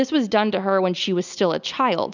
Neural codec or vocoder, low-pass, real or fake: none; 7.2 kHz; real